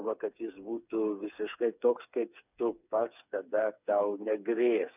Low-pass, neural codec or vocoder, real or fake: 3.6 kHz; codec, 16 kHz, 4 kbps, FreqCodec, smaller model; fake